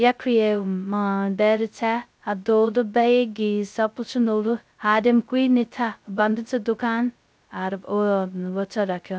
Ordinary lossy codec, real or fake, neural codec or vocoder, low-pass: none; fake; codec, 16 kHz, 0.2 kbps, FocalCodec; none